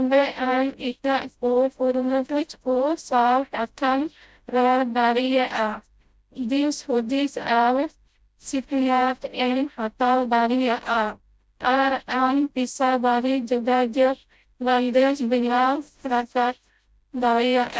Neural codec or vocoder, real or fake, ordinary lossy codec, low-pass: codec, 16 kHz, 0.5 kbps, FreqCodec, smaller model; fake; none; none